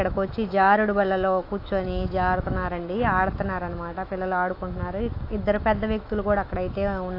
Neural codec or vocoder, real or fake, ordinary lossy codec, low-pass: autoencoder, 48 kHz, 128 numbers a frame, DAC-VAE, trained on Japanese speech; fake; AAC, 32 kbps; 5.4 kHz